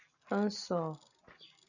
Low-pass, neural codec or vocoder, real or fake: 7.2 kHz; none; real